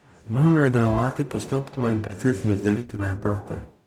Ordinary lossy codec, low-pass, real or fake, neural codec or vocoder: none; 19.8 kHz; fake; codec, 44.1 kHz, 0.9 kbps, DAC